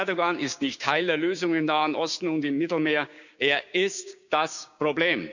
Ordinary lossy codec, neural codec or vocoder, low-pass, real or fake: none; codec, 16 kHz, 6 kbps, DAC; 7.2 kHz; fake